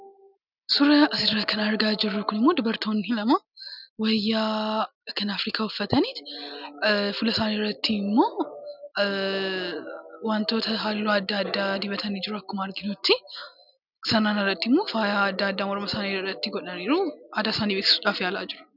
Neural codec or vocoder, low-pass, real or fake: none; 5.4 kHz; real